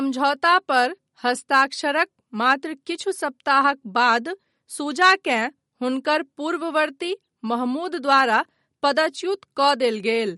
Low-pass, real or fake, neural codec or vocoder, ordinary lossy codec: 19.8 kHz; real; none; MP3, 48 kbps